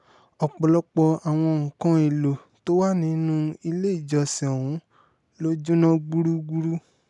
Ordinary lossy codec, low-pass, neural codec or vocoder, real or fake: none; 10.8 kHz; none; real